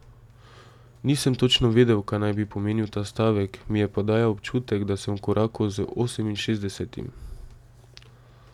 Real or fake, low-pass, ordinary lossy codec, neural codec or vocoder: real; 19.8 kHz; none; none